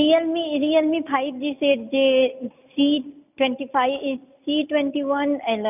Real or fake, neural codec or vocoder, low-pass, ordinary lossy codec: real; none; 3.6 kHz; none